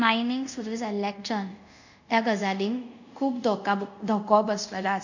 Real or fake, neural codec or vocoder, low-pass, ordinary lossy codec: fake; codec, 24 kHz, 0.5 kbps, DualCodec; 7.2 kHz; none